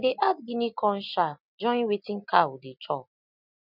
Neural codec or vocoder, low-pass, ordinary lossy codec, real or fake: none; 5.4 kHz; none; real